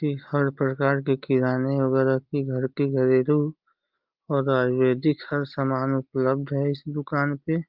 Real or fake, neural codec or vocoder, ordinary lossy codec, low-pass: real; none; Opus, 24 kbps; 5.4 kHz